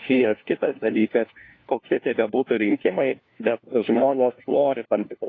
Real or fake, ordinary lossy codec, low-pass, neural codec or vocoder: fake; AAC, 32 kbps; 7.2 kHz; codec, 16 kHz, 1 kbps, FunCodec, trained on LibriTTS, 50 frames a second